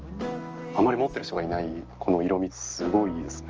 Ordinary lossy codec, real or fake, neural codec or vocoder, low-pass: Opus, 24 kbps; real; none; 7.2 kHz